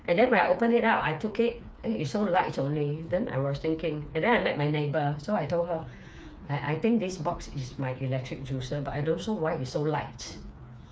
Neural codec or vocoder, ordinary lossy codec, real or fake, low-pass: codec, 16 kHz, 4 kbps, FreqCodec, smaller model; none; fake; none